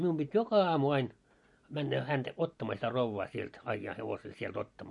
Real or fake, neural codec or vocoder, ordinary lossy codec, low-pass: real; none; MP3, 48 kbps; 10.8 kHz